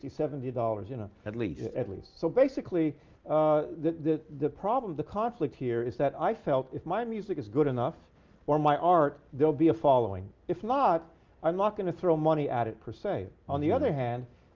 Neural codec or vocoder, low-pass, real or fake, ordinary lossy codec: none; 7.2 kHz; real; Opus, 32 kbps